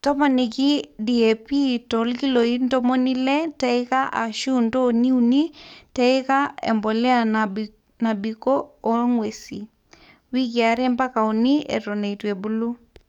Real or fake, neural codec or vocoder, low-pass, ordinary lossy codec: fake; codec, 44.1 kHz, 7.8 kbps, DAC; 19.8 kHz; none